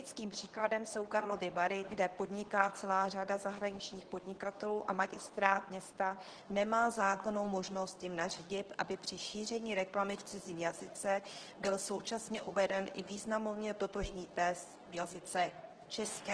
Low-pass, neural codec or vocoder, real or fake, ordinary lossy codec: 9.9 kHz; codec, 24 kHz, 0.9 kbps, WavTokenizer, medium speech release version 2; fake; Opus, 16 kbps